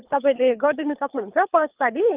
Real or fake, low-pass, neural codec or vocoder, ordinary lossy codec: fake; 3.6 kHz; codec, 24 kHz, 3 kbps, HILCodec; Opus, 32 kbps